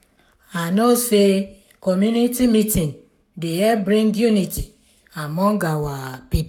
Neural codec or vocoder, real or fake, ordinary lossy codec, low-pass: codec, 44.1 kHz, 7.8 kbps, Pupu-Codec; fake; none; 19.8 kHz